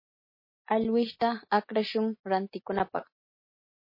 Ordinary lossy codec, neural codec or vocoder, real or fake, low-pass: MP3, 24 kbps; none; real; 5.4 kHz